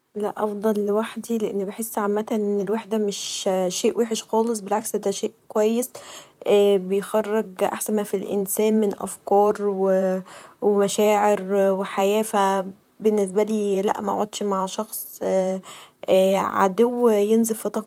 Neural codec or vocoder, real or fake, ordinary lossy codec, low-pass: vocoder, 44.1 kHz, 128 mel bands, Pupu-Vocoder; fake; none; 19.8 kHz